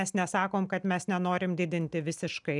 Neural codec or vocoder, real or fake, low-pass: none; real; 10.8 kHz